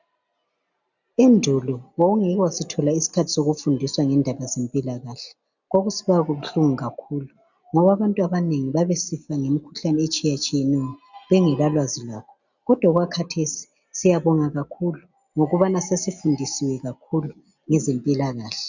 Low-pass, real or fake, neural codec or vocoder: 7.2 kHz; real; none